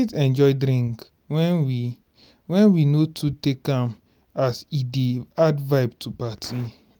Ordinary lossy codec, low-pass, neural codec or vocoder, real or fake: none; none; none; real